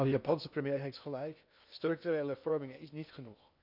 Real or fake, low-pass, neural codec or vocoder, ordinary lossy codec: fake; 5.4 kHz; codec, 16 kHz in and 24 kHz out, 0.6 kbps, FocalCodec, streaming, 2048 codes; none